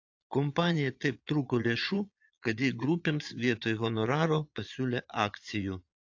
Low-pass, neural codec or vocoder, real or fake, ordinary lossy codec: 7.2 kHz; vocoder, 22.05 kHz, 80 mel bands, Vocos; fake; AAC, 48 kbps